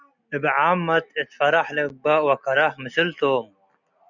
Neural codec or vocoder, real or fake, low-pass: none; real; 7.2 kHz